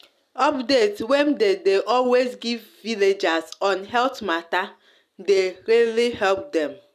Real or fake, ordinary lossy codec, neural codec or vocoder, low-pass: real; none; none; 14.4 kHz